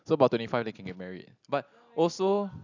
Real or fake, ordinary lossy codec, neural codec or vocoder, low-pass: real; none; none; 7.2 kHz